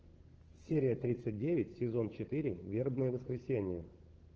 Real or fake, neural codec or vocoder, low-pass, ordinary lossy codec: fake; codec, 16 kHz, 8 kbps, FunCodec, trained on Chinese and English, 25 frames a second; 7.2 kHz; Opus, 16 kbps